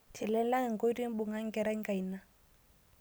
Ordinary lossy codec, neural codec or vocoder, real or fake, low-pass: none; none; real; none